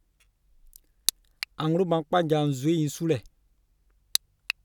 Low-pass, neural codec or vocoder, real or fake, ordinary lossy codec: 19.8 kHz; none; real; none